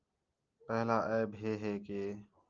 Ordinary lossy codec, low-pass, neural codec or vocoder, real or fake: Opus, 24 kbps; 7.2 kHz; none; real